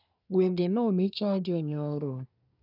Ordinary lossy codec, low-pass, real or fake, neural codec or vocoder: none; 5.4 kHz; fake; codec, 24 kHz, 1 kbps, SNAC